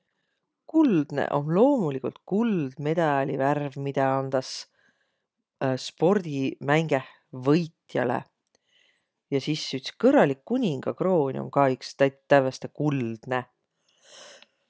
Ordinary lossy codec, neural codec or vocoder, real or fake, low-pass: none; none; real; none